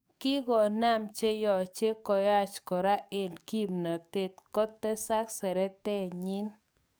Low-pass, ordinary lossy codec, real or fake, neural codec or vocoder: none; none; fake; codec, 44.1 kHz, 7.8 kbps, DAC